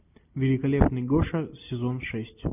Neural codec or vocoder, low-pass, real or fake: none; 3.6 kHz; real